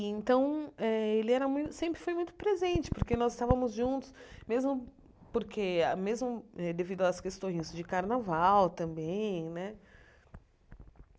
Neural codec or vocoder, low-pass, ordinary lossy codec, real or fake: none; none; none; real